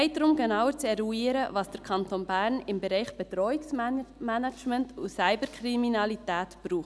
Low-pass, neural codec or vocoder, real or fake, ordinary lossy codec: none; none; real; none